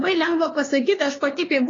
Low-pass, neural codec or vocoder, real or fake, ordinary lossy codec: 7.2 kHz; codec, 16 kHz, 2 kbps, X-Codec, HuBERT features, trained on LibriSpeech; fake; AAC, 32 kbps